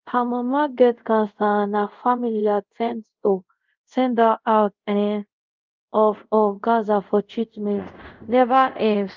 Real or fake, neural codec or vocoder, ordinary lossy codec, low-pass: fake; codec, 24 kHz, 0.5 kbps, DualCodec; Opus, 32 kbps; 7.2 kHz